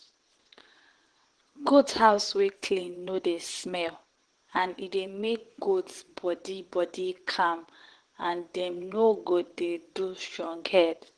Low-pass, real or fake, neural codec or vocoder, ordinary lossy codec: 9.9 kHz; fake; vocoder, 22.05 kHz, 80 mel bands, WaveNeXt; Opus, 16 kbps